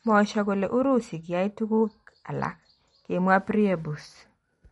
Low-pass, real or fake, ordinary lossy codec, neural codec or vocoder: 19.8 kHz; real; MP3, 48 kbps; none